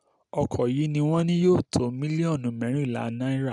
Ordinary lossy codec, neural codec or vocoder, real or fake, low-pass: none; none; real; 10.8 kHz